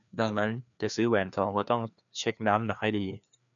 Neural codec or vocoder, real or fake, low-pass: codec, 16 kHz, 2 kbps, FreqCodec, larger model; fake; 7.2 kHz